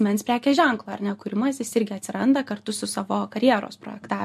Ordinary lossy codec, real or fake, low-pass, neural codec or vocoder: MP3, 64 kbps; real; 14.4 kHz; none